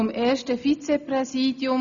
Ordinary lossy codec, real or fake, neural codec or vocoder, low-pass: none; real; none; 7.2 kHz